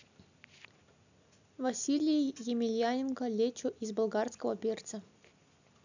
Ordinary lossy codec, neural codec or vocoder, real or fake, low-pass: none; none; real; 7.2 kHz